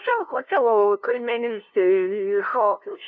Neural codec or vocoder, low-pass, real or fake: codec, 16 kHz, 0.5 kbps, FunCodec, trained on LibriTTS, 25 frames a second; 7.2 kHz; fake